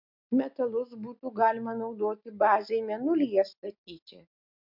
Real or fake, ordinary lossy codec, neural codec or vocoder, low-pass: real; MP3, 48 kbps; none; 5.4 kHz